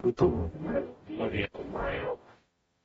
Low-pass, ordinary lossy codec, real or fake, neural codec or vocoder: 19.8 kHz; AAC, 24 kbps; fake; codec, 44.1 kHz, 0.9 kbps, DAC